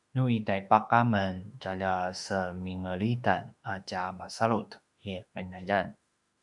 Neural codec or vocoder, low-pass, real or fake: autoencoder, 48 kHz, 32 numbers a frame, DAC-VAE, trained on Japanese speech; 10.8 kHz; fake